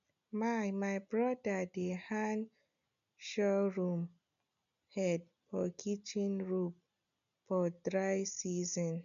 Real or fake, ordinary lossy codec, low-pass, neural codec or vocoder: real; none; 7.2 kHz; none